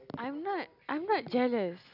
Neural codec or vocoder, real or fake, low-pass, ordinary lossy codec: none; real; 5.4 kHz; none